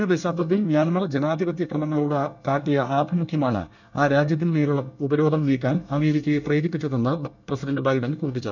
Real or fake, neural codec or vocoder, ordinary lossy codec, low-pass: fake; codec, 24 kHz, 1 kbps, SNAC; none; 7.2 kHz